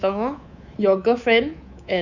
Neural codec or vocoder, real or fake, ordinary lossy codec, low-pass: codec, 24 kHz, 3.1 kbps, DualCodec; fake; none; 7.2 kHz